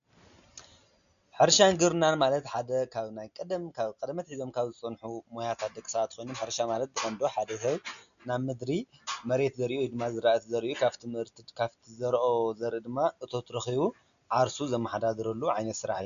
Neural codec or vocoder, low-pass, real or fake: none; 7.2 kHz; real